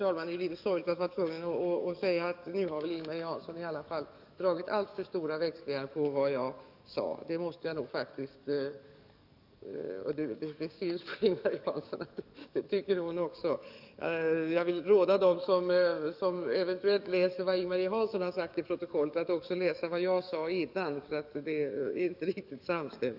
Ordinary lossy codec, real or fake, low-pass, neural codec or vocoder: none; fake; 5.4 kHz; codec, 44.1 kHz, 7.8 kbps, DAC